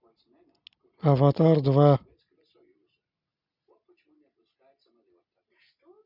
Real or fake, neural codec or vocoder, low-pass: real; none; 5.4 kHz